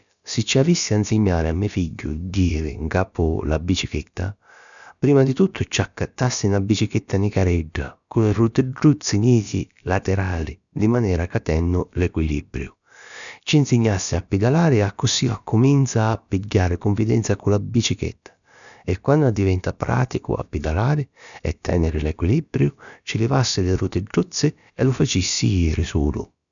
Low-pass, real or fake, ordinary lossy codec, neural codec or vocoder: 7.2 kHz; fake; none; codec, 16 kHz, about 1 kbps, DyCAST, with the encoder's durations